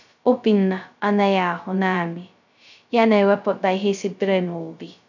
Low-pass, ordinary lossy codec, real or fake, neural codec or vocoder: 7.2 kHz; none; fake; codec, 16 kHz, 0.2 kbps, FocalCodec